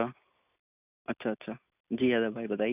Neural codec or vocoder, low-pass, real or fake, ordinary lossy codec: none; 3.6 kHz; real; none